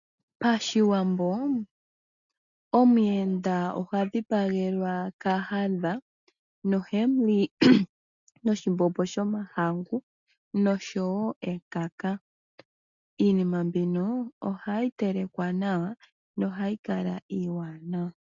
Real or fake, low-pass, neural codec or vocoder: real; 7.2 kHz; none